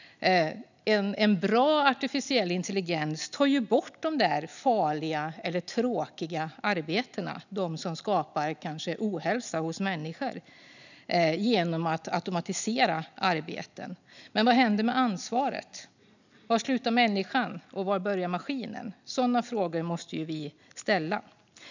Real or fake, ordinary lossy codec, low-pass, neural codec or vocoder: real; none; 7.2 kHz; none